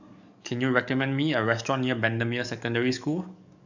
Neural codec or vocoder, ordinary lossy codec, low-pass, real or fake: codec, 44.1 kHz, 7.8 kbps, DAC; none; 7.2 kHz; fake